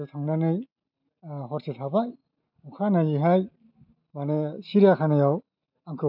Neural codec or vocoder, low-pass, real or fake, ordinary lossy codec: none; 5.4 kHz; real; MP3, 48 kbps